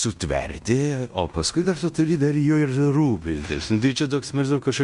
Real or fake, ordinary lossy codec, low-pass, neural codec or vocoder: fake; Opus, 64 kbps; 10.8 kHz; codec, 16 kHz in and 24 kHz out, 0.9 kbps, LongCat-Audio-Codec, fine tuned four codebook decoder